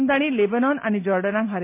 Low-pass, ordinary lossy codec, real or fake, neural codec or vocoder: 3.6 kHz; MP3, 24 kbps; real; none